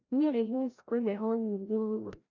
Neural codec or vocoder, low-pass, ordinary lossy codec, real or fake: codec, 16 kHz, 0.5 kbps, FreqCodec, larger model; 7.2 kHz; none; fake